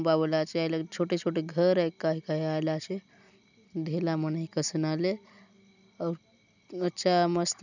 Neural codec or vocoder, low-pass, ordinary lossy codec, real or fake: none; 7.2 kHz; none; real